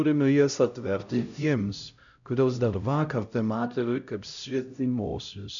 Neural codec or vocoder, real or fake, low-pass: codec, 16 kHz, 0.5 kbps, X-Codec, HuBERT features, trained on LibriSpeech; fake; 7.2 kHz